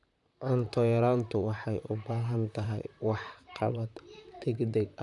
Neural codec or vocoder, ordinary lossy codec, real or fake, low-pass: vocoder, 44.1 kHz, 128 mel bands, Pupu-Vocoder; none; fake; 10.8 kHz